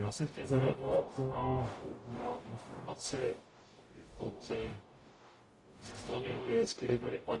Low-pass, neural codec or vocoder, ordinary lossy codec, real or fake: 10.8 kHz; codec, 44.1 kHz, 0.9 kbps, DAC; AAC, 32 kbps; fake